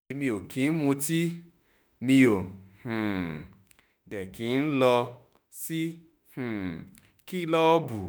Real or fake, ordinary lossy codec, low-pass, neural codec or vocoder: fake; none; none; autoencoder, 48 kHz, 32 numbers a frame, DAC-VAE, trained on Japanese speech